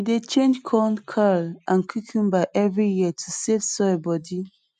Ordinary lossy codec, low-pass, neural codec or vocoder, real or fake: MP3, 96 kbps; 9.9 kHz; none; real